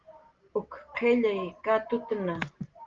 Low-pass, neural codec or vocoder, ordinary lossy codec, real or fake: 7.2 kHz; none; Opus, 16 kbps; real